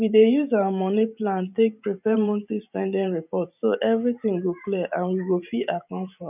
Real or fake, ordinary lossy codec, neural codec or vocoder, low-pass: fake; none; vocoder, 44.1 kHz, 128 mel bands every 512 samples, BigVGAN v2; 3.6 kHz